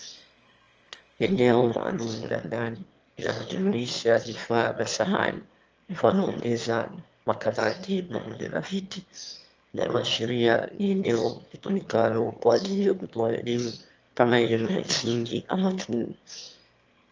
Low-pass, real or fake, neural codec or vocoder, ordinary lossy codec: 7.2 kHz; fake; autoencoder, 22.05 kHz, a latent of 192 numbers a frame, VITS, trained on one speaker; Opus, 24 kbps